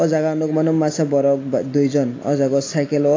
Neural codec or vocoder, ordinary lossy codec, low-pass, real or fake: none; AAC, 32 kbps; 7.2 kHz; real